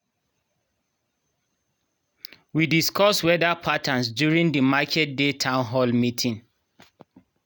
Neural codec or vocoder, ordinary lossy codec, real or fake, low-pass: none; none; real; none